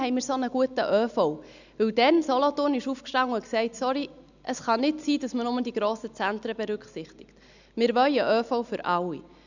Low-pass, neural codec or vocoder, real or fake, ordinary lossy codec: 7.2 kHz; none; real; none